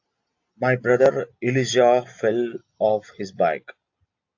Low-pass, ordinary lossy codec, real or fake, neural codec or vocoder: 7.2 kHz; AAC, 48 kbps; real; none